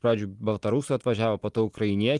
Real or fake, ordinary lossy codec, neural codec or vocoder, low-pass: real; Opus, 32 kbps; none; 10.8 kHz